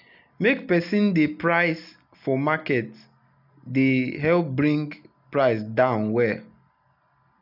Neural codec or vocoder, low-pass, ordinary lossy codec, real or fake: none; 5.4 kHz; none; real